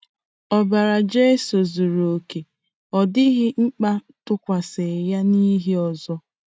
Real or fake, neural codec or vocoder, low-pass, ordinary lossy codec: real; none; none; none